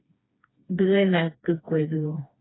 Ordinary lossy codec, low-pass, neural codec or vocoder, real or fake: AAC, 16 kbps; 7.2 kHz; codec, 16 kHz, 2 kbps, FreqCodec, smaller model; fake